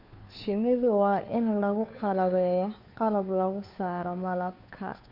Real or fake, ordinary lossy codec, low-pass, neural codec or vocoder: fake; none; 5.4 kHz; codec, 16 kHz, 2 kbps, FunCodec, trained on Chinese and English, 25 frames a second